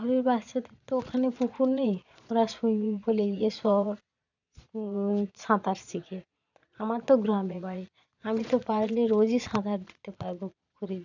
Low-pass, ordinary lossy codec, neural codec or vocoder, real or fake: 7.2 kHz; none; vocoder, 22.05 kHz, 80 mel bands, Vocos; fake